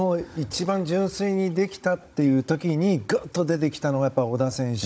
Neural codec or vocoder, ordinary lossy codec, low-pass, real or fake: codec, 16 kHz, 16 kbps, FunCodec, trained on Chinese and English, 50 frames a second; none; none; fake